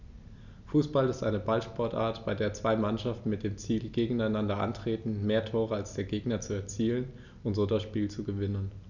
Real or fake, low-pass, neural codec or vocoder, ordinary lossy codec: real; 7.2 kHz; none; none